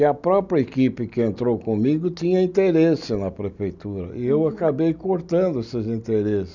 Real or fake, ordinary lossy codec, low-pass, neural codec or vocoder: real; none; 7.2 kHz; none